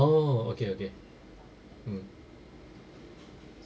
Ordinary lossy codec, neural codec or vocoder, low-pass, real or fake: none; none; none; real